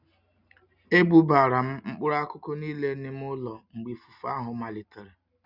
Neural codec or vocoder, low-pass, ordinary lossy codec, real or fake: none; 5.4 kHz; none; real